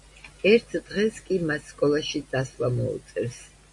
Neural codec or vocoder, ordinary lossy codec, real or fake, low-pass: none; MP3, 64 kbps; real; 10.8 kHz